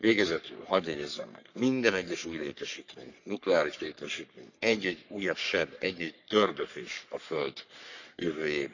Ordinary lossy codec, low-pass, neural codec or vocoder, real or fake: none; 7.2 kHz; codec, 44.1 kHz, 3.4 kbps, Pupu-Codec; fake